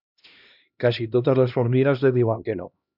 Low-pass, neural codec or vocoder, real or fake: 5.4 kHz; codec, 16 kHz, 1 kbps, X-Codec, HuBERT features, trained on LibriSpeech; fake